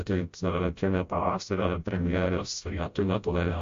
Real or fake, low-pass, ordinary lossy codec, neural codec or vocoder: fake; 7.2 kHz; MP3, 48 kbps; codec, 16 kHz, 0.5 kbps, FreqCodec, smaller model